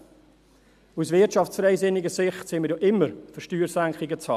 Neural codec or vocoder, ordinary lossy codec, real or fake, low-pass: none; none; real; 14.4 kHz